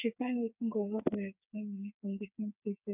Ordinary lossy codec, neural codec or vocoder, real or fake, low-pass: none; codec, 16 kHz, 4 kbps, FreqCodec, smaller model; fake; 3.6 kHz